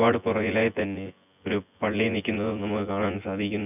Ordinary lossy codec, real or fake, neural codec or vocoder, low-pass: none; fake; vocoder, 24 kHz, 100 mel bands, Vocos; 3.6 kHz